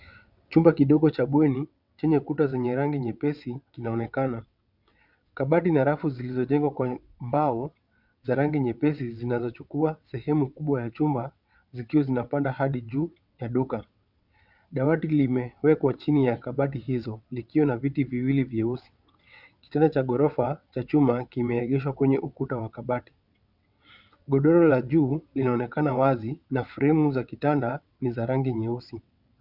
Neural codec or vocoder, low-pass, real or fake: vocoder, 22.05 kHz, 80 mel bands, WaveNeXt; 5.4 kHz; fake